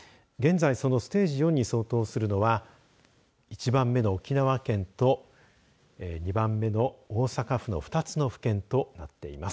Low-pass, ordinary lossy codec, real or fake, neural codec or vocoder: none; none; real; none